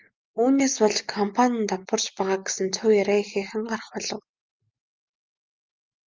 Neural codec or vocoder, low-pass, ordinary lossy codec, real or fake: none; 7.2 kHz; Opus, 32 kbps; real